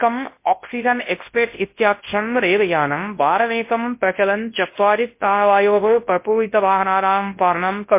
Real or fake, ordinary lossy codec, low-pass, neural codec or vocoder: fake; MP3, 24 kbps; 3.6 kHz; codec, 24 kHz, 0.9 kbps, WavTokenizer, large speech release